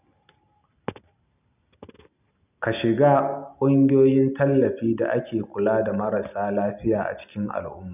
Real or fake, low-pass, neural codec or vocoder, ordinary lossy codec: real; 3.6 kHz; none; none